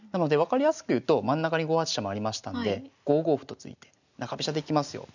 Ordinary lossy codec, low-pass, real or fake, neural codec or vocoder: none; 7.2 kHz; real; none